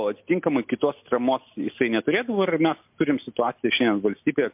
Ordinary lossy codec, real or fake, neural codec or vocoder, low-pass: MP3, 32 kbps; real; none; 3.6 kHz